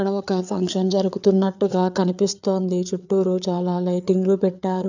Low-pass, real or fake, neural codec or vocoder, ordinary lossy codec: 7.2 kHz; fake; codec, 16 kHz, 4 kbps, FreqCodec, larger model; none